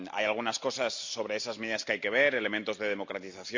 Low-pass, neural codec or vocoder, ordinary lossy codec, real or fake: 7.2 kHz; none; MP3, 64 kbps; real